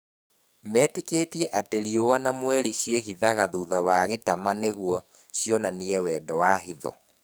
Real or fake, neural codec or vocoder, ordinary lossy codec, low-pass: fake; codec, 44.1 kHz, 2.6 kbps, SNAC; none; none